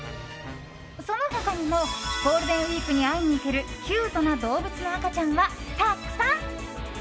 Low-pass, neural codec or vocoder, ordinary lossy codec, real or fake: none; none; none; real